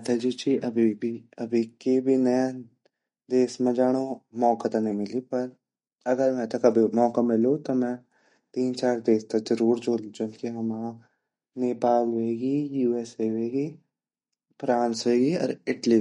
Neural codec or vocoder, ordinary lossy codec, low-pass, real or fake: none; MP3, 48 kbps; 10.8 kHz; real